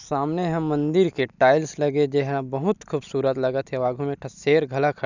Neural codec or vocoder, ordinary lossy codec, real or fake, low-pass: none; none; real; 7.2 kHz